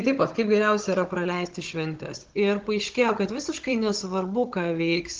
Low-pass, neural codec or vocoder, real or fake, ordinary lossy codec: 7.2 kHz; codec, 16 kHz, 16 kbps, FunCodec, trained on Chinese and English, 50 frames a second; fake; Opus, 16 kbps